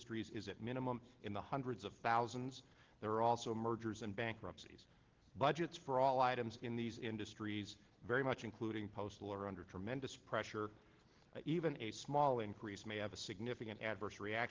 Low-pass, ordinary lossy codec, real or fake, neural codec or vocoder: 7.2 kHz; Opus, 16 kbps; real; none